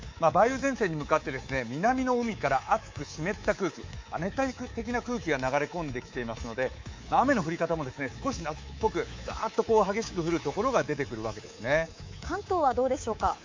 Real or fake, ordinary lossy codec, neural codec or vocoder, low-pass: fake; MP3, 48 kbps; codec, 24 kHz, 3.1 kbps, DualCodec; 7.2 kHz